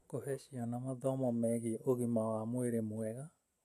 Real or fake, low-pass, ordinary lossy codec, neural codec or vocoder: real; 14.4 kHz; none; none